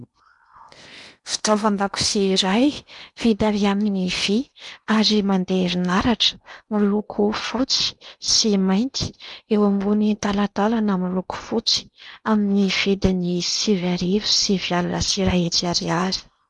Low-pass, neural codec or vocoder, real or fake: 10.8 kHz; codec, 16 kHz in and 24 kHz out, 0.8 kbps, FocalCodec, streaming, 65536 codes; fake